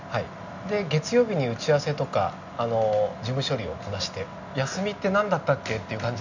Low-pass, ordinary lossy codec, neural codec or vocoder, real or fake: 7.2 kHz; none; none; real